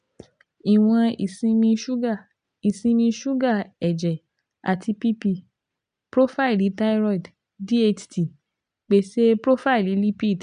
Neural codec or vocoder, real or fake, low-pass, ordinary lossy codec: none; real; 9.9 kHz; MP3, 96 kbps